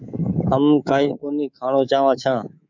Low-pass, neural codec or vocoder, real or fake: 7.2 kHz; codec, 24 kHz, 3.1 kbps, DualCodec; fake